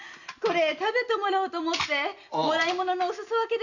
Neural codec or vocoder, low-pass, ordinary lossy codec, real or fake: none; 7.2 kHz; none; real